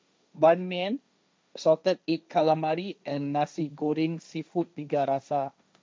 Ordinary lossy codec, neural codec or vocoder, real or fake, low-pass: none; codec, 16 kHz, 1.1 kbps, Voila-Tokenizer; fake; none